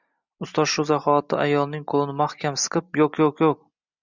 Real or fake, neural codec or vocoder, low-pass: real; none; 7.2 kHz